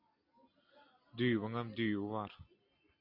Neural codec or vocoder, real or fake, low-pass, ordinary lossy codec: none; real; 5.4 kHz; MP3, 32 kbps